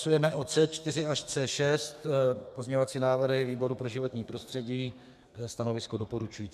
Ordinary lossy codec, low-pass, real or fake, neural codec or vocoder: MP3, 96 kbps; 14.4 kHz; fake; codec, 32 kHz, 1.9 kbps, SNAC